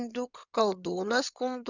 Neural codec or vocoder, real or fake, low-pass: codec, 16 kHz in and 24 kHz out, 2.2 kbps, FireRedTTS-2 codec; fake; 7.2 kHz